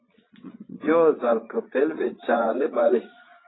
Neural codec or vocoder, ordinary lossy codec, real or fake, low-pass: vocoder, 22.05 kHz, 80 mel bands, Vocos; AAC, 16 kbps; fake; 7.2 kHz